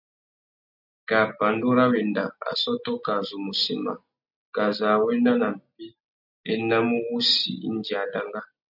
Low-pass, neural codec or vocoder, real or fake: 5.4 kHz; none; real